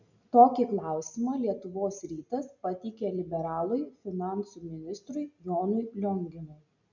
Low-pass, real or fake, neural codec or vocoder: 7.2 kHz; real; none